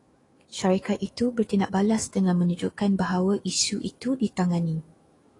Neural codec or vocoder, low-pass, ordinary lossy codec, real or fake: autoencoder, 48 kHz, 128 numbers a frame, DAC-VAE, trained on Japanese speech; 10.8 kHz; AAC, 32 kbps; fake